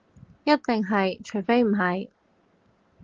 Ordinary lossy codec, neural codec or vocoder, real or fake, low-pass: Opus, 16 kbps; none; real; 7.2 kHz